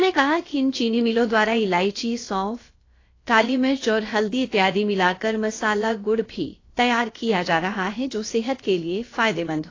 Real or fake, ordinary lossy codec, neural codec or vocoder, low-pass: fake; AAC, 32 kbps; codec, 16 kHz, about 1 kbps, DyCAST, with the encoder's durations; 7.2 kHz